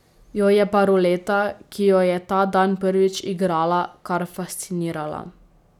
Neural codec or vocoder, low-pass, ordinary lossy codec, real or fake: none; 19.8 kHz; none; real